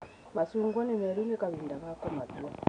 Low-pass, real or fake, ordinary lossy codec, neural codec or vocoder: 9.9 kHz; real; none; none